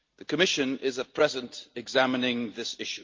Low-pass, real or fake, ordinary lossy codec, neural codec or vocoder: 7.2 kHz; real; Opus, 32 kbps; none